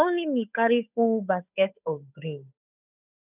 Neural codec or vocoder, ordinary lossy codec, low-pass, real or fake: codec, 16 kHz, 8 kbps, FunCodec, trained on Chinese and English, 25 frames a second; none; 3.6 kHz; fake